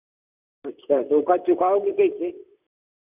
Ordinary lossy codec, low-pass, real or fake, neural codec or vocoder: none; 3.6 kHz; fake; vocoder, 44.1 kHz, 128 mel bands, Pupu-Vocoder